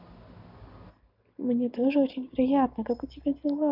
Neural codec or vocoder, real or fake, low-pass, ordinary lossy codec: none; real; 5.4 kHz; none